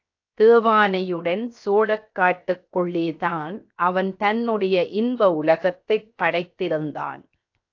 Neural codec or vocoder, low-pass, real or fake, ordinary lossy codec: codec, 16 kHz, 0.7 kbps, FocalCodec; 7.2 kHz; fake; AAC, 48 kbps